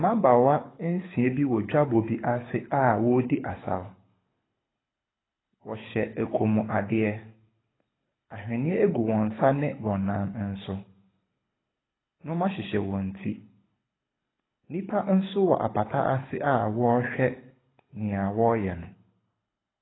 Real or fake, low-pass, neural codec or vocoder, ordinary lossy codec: fake; 7.2 kHz; codec, 24 kHz, 6 kbps, HILCodec; AAC, 16 kbps